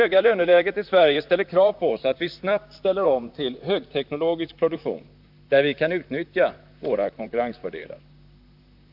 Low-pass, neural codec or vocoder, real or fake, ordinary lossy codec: 5.4 kHz; vocoder, 44.1 kHz, 128 mel bands, Pupu-Vocoder; fake; AAC, 48 kbps